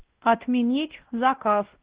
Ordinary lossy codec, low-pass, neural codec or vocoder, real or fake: Opus, 16 kbps; 3.6 kHz; codec, 16 kHz, 1 kbps, X-Codec, WavLM features, trained on Multilingual LibriSpeech; fake